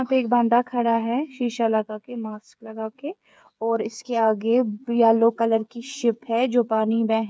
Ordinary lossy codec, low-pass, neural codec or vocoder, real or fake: none; none; codec, 16 kHz, 8 kbps, FreqCodec, smaller model; fake